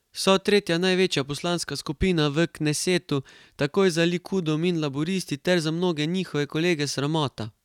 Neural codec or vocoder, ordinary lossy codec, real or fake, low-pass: none; none; real; 19.8 kHz